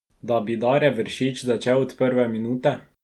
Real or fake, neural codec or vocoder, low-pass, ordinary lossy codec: real; none; 19.8 kHz; Opus, 32 kbps